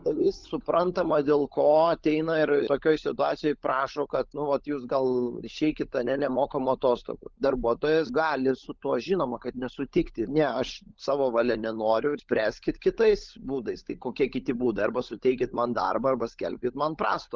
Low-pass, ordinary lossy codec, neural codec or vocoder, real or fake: 7.2 kHz; Opus, 24 kbps; codec, 16 kHz, 16 kbps, FunCodec, trained on LibriTTS, 50 frames a second; fake